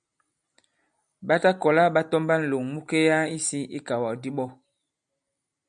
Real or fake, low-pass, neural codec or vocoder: real; 9.9 kHz; none